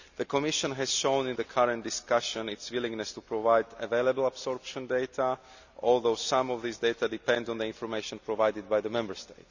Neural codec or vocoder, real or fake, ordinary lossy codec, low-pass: none; real; none; 7.2 kHz